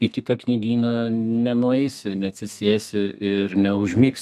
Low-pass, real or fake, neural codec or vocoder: 14.4 kHz; fake; codec, 32 kHz, 1.9 kbps, SNAC